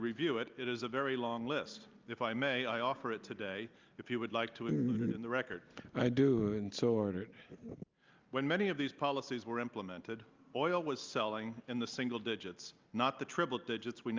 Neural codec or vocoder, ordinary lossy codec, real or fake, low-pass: none; Opus, 24 kbps; real; 7.2 kHz